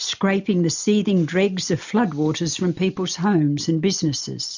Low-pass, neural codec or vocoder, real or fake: 7.2 kHz; none; real